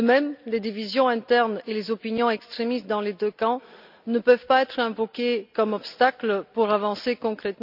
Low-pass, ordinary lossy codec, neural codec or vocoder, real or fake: 5.4 kHz; none; none; real